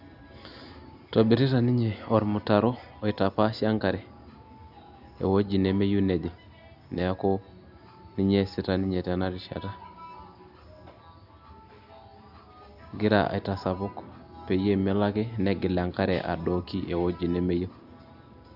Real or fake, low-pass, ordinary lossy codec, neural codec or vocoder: real; 5.4 kHz; none; none